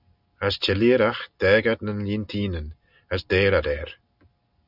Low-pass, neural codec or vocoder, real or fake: 5.4 kHz; none; real